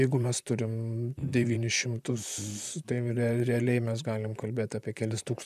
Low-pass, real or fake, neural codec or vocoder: 14.4 kHz; fake; vocoder, 44.1 kHz, 128 mel bands, Pupu-Vocoder